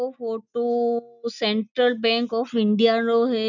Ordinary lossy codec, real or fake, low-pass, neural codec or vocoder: none; real; 7.2 kHz; none